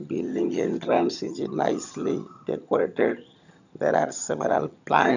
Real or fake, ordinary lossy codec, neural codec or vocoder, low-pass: fake; none; vocoder, 22.05 kHz, 80 mel bands, HiFi-GAN; 7.2 kHz